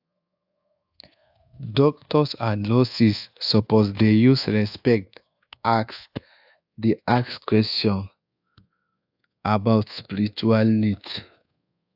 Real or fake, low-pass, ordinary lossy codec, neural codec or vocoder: fake; 5.4 kHz; AAC, 48 kbps; codec, 24 kHz, 1.2 kbps, DualCodec